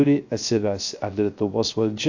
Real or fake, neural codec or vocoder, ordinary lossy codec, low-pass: fake; codec, 16 kHz, 0.3 kbps, FocalCodec; AAC, 48 kbps; 7.2 kHz